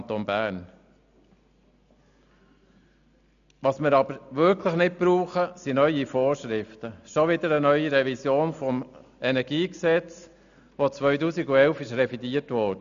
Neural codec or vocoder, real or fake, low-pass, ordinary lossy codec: none; real; 7.2 kHz; none